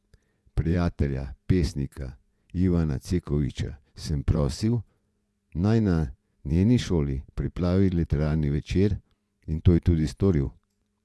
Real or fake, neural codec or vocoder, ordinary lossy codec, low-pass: fake; vocoder, 24 kHz, 100 mel bands, Vocos; none; none